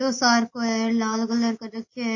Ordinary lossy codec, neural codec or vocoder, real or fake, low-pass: MP3, 32 kbps; none; real; 7.2 kHz